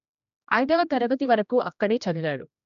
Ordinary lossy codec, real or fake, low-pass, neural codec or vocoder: none; fake; 7.2 kHz; codec, 16 kHz, 1 kbps, X-Codec, HuBERT features, trained on general audio